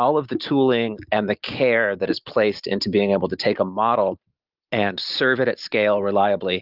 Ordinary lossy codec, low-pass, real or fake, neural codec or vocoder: Opus, 24 kbps; 5.4 kHz; real; none